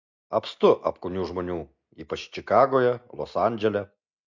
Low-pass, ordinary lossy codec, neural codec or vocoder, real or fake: 7.2 kHz; MP3, 64 kbps; none; real